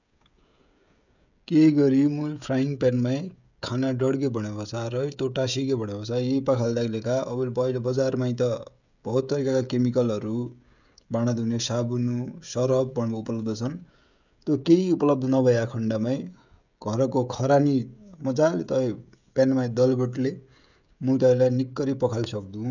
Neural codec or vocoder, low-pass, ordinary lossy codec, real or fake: codec, 16 kHz, 16 kbps, FreqCodec, smaller model; 7.2 kHz; none; fake